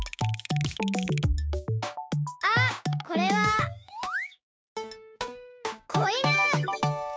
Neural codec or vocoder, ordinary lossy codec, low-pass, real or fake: codec, 16 kHz, 6 kbps, DAC; none; none; fake